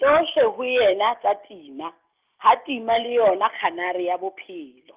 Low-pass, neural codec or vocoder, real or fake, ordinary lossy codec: 3.6 kHz; none; real; Opus, 32 kbps